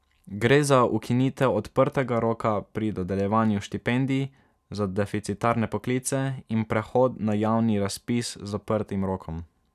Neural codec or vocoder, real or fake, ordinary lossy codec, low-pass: none; real; none; 14.4 kHz